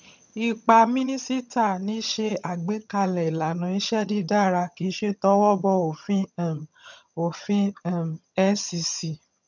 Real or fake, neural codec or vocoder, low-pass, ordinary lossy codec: fake; vocoder, 22.05 kHz, 80 mel bands, HiFi-GAN; 7.2 kHz; none